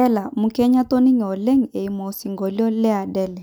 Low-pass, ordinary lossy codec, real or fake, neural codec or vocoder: none; none; real; none